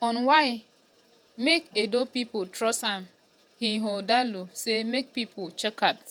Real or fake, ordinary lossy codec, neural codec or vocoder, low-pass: fake; none; vocoder, 48 kHz, 128 mel bands, Vocos; none